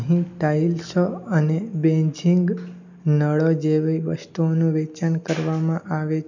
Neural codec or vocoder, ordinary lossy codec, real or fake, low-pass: none; none; real; 7.2 kHz